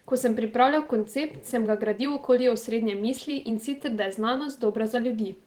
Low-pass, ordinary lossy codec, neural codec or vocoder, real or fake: 19.8 kHz; Opus, 16 kbps; vocoder, 44.1 kHz, 128 mel bands every 512 samples, BigVGAN v2; fake